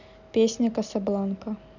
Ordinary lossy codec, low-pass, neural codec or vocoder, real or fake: none; 7.2 kHz; none; real